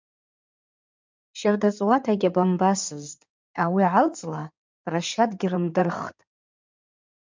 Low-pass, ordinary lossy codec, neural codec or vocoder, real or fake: 7.2 kHz; MP3, 64 kbps; codec, 16 kHz in and 24 kHz out, 2.2 kbps, FireRedTTS-2 codec; fake